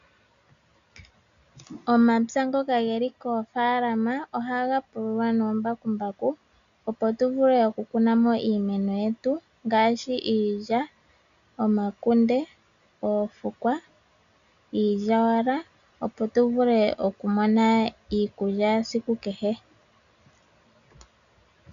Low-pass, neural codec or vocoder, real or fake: 7.2 kHz; none; real